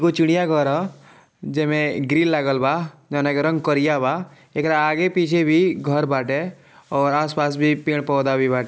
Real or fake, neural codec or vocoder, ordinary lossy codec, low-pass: real; none; none; none